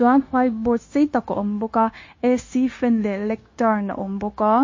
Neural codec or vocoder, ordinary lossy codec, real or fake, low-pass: codec, 16 kHz, 0.9 kbps, LongCat-Audio-Codec; MP3, 32 kbps; fake; 7.2 kHz